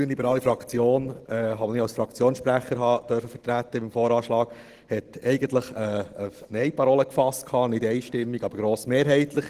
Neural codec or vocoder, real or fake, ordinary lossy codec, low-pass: none; real; Opus, 16 kbps; 14.4 kHz